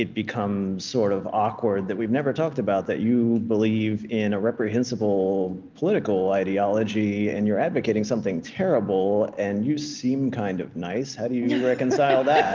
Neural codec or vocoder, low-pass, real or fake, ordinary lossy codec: none; 7.2 kHz; real; Opus, 24 kbps